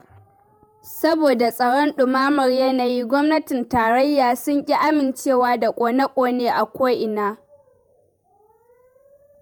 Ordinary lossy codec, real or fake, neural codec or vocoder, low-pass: none; fake; vocoder, 48 kHz, 128 mel bands, Vocos; none